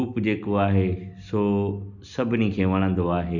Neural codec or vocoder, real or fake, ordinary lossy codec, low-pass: none; real; none; 7.2 kHz